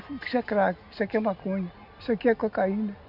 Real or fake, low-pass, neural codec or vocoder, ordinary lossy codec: real; 5.4 kHz; none; none